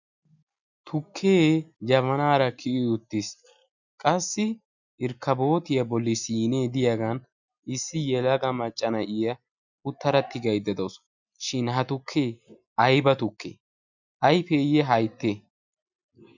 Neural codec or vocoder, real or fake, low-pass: none; real; 7.2 kHz